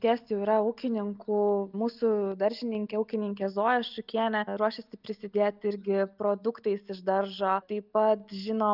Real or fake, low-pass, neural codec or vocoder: real; 5.4 kHz; none